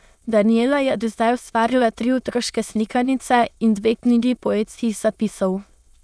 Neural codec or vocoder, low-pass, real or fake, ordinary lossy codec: autoencoder, 22.05 kHz, a latent of 192 numbers a frame, VITS, trained on many speakers; none; fake; none